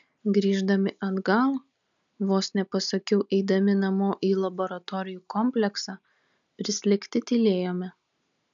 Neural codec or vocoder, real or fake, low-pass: none; real; 7.2 kHz